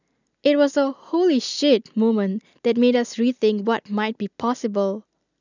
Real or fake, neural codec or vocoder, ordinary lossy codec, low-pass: real; none; none; 7.2 kHz